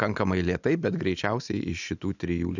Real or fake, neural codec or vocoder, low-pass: real; none; 7.2 kHz